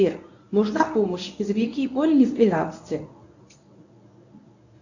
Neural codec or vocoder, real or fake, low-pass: codec, 24 kHz, 0.9 kbps, WavTokenizer, medium speech release version 1; fake; 7.2 kHz